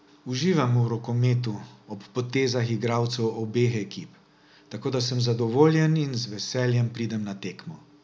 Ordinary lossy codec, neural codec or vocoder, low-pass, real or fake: none; none; none; real